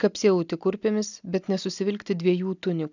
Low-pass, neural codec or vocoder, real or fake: 7.2 kHz; none; real